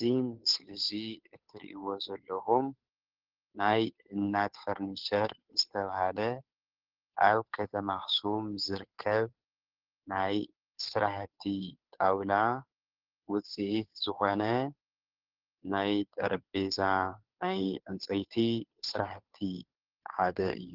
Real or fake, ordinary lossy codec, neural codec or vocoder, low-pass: fake; Opus, 16 kbps; codec, 16 kHz, 2 kbps, FunCodec, trained on Chinese and English, 25 frames a second; 5.4 kHz